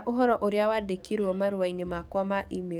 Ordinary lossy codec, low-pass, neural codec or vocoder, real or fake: none; 19.8 kHz; codec, 44.1 kHz, 7.8 kbps, DAC; fake